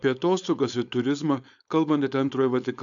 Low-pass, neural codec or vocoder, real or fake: 7.2 kHz; codec, 16 kHz, 4.8 kbps, FACodec; fake